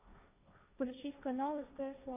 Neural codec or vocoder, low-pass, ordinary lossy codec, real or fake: codec, 16 kHz, 1.1 kbps, Voila-Tokenizer; 3.6 kHz; AAC, 32 kbps; fake